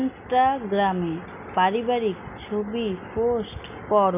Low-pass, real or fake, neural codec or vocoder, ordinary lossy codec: 3.6 kHz; real; none; none